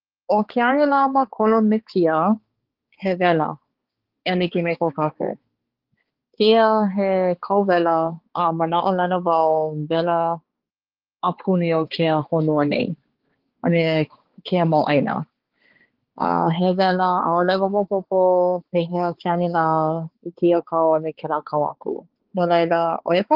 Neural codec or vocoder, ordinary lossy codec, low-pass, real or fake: codec, 16 kHz, 4 kbps, X-Codec, HuBERT features, trained on balanced general audio; Opus, 16 kbps; 5.4 kHz; fake